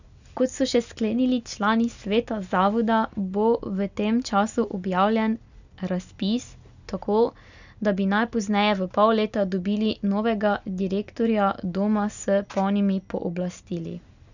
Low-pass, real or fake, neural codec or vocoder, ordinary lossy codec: 7.2 kHz; real; none; none